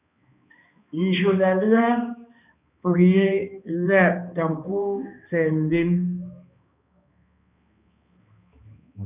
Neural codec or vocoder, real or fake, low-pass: codec, 16 kHz, 2 kbps, X-Codec, HuBERT features, trained on balanced general audio; fake; 3.6 kHz